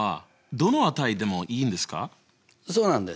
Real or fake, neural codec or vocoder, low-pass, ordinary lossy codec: real; none; none; none